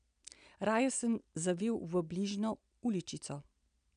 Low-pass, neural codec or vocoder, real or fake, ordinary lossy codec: 9.9 kHz; none; real; none